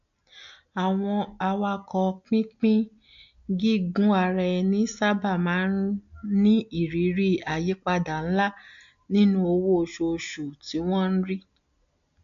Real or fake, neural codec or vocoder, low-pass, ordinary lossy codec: real; none; 7.2 kHz; AAC, 64 kbps